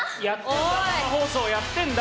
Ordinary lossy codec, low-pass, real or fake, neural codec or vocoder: none; none; real; none